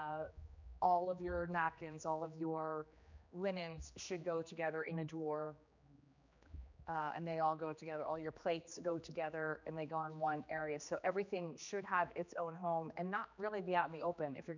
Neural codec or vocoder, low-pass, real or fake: codec, 16 kHz, 2 kbps, X-Codec, HuBERT features, trained on general audio; 7.2 kHz; fake